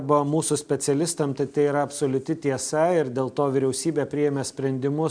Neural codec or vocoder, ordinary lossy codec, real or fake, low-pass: none; MP3, 96 kbps; real; 9.9 kHz